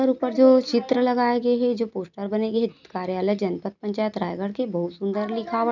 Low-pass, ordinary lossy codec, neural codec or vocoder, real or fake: 7.2 kHz; none; none; real